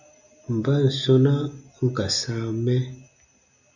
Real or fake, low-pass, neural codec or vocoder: real; 7.2 kHz; none